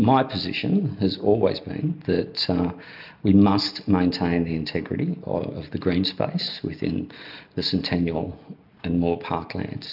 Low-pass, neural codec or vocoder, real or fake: 5.4 kHz; none; real